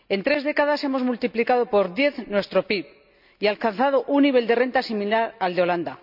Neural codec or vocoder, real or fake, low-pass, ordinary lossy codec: none; real; 5.4 kHz; none